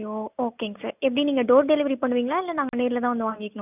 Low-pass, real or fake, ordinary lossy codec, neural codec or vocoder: 3.6 kHz; real; none; none